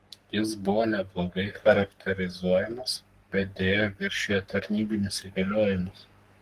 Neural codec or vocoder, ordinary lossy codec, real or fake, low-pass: codec, 44.1 kHz, 3.4 kbps, Pupu-Codec; Opus, 24 kbps; fake; 14.4 kHz